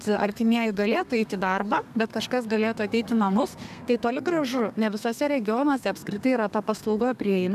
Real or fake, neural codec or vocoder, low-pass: fake; codec, 32 kHz, 1.9 kbps, SNAC; 14.4 kHz